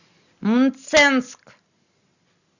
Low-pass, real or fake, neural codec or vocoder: 7.2 kHz; real; none